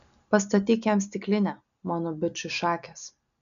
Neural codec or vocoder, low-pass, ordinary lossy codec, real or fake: none; 7.2 kHz; MP3, 96 kbps; real